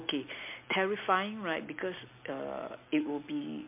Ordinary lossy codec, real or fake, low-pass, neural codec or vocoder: MP3, 24 kbps; real; 3.6 kHz; none